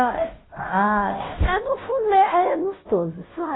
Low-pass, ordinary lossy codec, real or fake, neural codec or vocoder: 7.2 kHz; AAC, 16 kbps; fake; codec, 16 kHz, 0.5 kbps, FunCodec, trained on Chinese and English, 25 frames a second